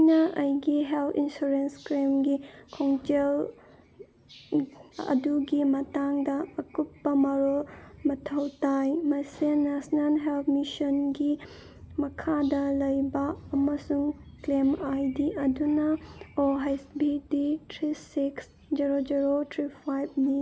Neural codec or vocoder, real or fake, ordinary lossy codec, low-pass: none; real; none; none